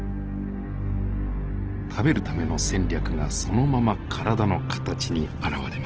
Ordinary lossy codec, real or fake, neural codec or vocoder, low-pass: Opus, 16 kbps; real; none; 7.2 kHz